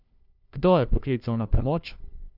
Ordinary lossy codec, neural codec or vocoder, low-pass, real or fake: none; codec, 16 kHz, 1 kbps, FunCodec, trained on LibriTTS, 50 frames a second; 5.4 kHz; fake